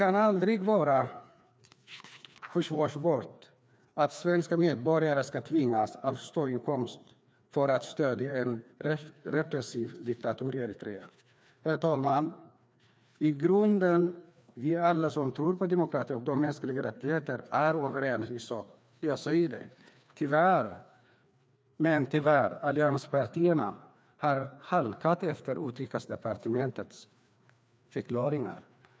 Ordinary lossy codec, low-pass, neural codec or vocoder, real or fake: none; none; codec, 16 kHz, 2 kbps, FreqCodec, larger model; fake